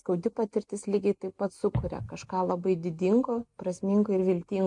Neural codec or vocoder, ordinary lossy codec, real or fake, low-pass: none; MP3, 64 kbps; real; 10.8 kHz